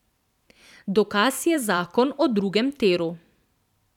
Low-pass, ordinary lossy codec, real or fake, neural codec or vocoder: 19.8 kHz; none; fake; vocoder, 44.1 kHz, 128 mel bands every 512 samples, BigVGAN v2